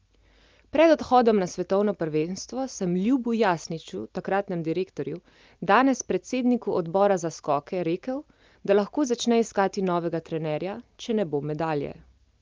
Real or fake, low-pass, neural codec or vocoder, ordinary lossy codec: real; 7.2 kHz; none; Opus, 24 kbps